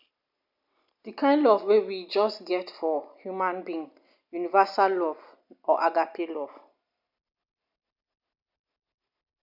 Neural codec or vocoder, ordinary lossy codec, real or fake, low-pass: none; none; real; 5.4 kHz